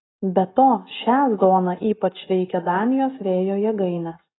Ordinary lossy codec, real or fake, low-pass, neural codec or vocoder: AAC, 16 kbps; real; 7.2 kHz; none